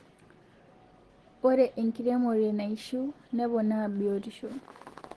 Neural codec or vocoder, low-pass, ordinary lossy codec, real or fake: none; 10.8 kHz; Opus, 16 kbps; real